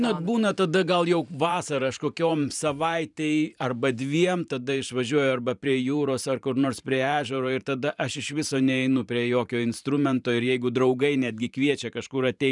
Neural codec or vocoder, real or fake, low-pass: vocoder, 44.1 kHz, 128 mel bands every 512 samples, BigVGAN v2; fake; 10.8 kHz